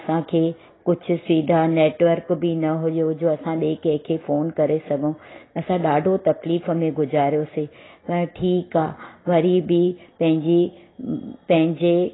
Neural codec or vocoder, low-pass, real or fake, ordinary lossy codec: none; 7.2 kHz; real; AAC, 16 kbps